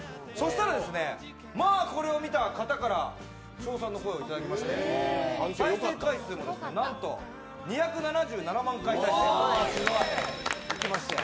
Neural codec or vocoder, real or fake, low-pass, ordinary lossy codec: none; real; none; none